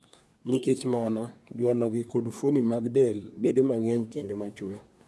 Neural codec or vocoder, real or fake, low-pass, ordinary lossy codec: codec, 24 kHz, 1 kbps, SNAC; fake; none; none